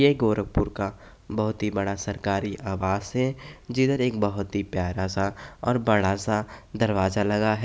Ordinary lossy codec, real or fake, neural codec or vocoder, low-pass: none; real; none; none